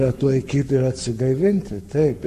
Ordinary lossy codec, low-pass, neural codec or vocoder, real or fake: MP3, 64 kbps; 14.4 kHz; codec, 44.1 kHz, 7.8 kbps, Pupu-Codec; fake